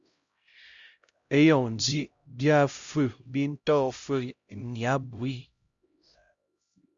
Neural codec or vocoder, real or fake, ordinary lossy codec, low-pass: codec, 16 kHz, 0.5 kbps, X-Codec, HuBERT features, trained on LibriSpeech; fake; Opus, 64 kbps; 7.2 kHz